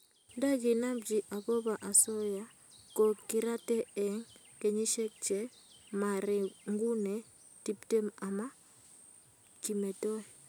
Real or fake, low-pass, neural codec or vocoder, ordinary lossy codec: real; none; none; none